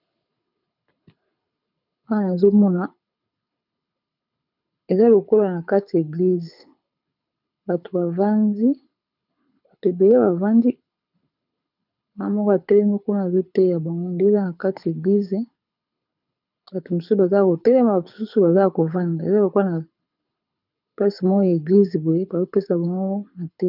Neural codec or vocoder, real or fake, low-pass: codec, 24 kHz, 6 kbps, HILCodec; fake; 5.4 kHz